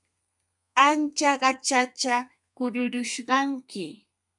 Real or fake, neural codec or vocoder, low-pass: fake; codec, 32 kHz, 1.9 kbps, SNAC; 10.8 kHz